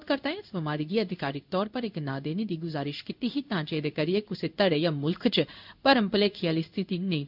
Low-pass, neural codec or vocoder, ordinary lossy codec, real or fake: 5.4 kHz; codec, 16 kHz in and 24 kHz out, 1 kbps, XY-Tokenizer; none; fake